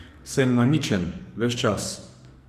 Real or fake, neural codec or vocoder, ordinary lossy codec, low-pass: fake; codec, 44.1 kHz, 2.6 kbps, SNAC; Opus, 64 kbps; 14.4 kHz